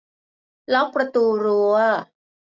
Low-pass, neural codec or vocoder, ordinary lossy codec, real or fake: none; none; none; real